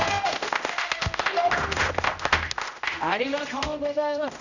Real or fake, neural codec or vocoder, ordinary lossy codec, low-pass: fake; codec, 16 kHz, 0.5 kbps, X-Codec, HuBERT features, trained on general audio; none; 7.2 kHz